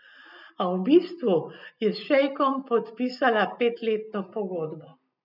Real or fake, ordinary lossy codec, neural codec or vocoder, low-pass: real; none; none; 5.4 kHz